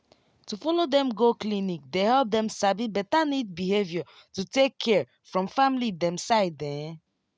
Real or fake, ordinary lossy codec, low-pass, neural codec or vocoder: real; none; none; none